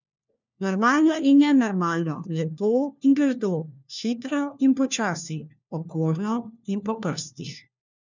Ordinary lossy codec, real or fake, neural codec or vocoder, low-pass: none; fake; codec, 16 kHz, 1 kbps, FunCodec, trained on LibriTTS, 50 frames a second; 7.2 kHz